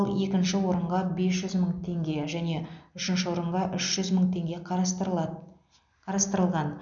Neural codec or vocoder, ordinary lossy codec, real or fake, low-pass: none; none; real; 7.2 kHz